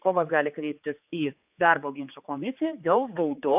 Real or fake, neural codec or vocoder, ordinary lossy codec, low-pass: fake; codec, 16 kHz, 4 kbps, X-Codec, HuBERT features, trained on general audio; AAC, 32 kbps; 3.6 kHz